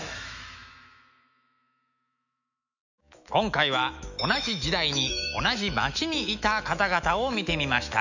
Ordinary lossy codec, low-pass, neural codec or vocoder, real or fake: none; 7.2 kHz; autoencoder, 48 kHz, 128 numbers a frame, DAC-VAE, trained on Japanese speech; fake